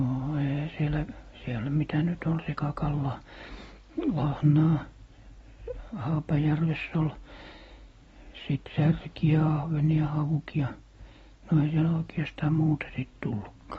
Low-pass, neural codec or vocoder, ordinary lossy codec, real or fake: 10.8 kHz; none; AAC, 24 kbps; real